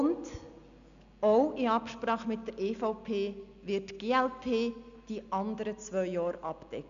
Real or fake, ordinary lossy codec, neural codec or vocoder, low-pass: real; none; none; 7.2 kHz